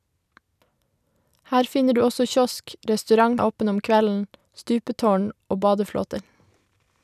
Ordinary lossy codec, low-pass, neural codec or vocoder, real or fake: none; 14.4 kHz; none; real